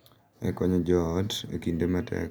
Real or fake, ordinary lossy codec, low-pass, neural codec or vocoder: real; none; none; none